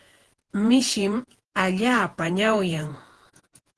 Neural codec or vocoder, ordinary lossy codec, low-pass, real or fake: vocoder, 48 kHz, 128 mel bands, Vocos; Opus, 16 kbps; 10.8 kHz; fake